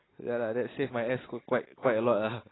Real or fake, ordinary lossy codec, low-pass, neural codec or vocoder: real; AAC, 16 kbps; 7.2 kHz; none